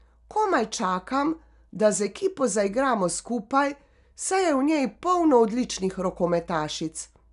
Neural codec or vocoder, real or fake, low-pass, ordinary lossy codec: none; real; 10.8 kHz; none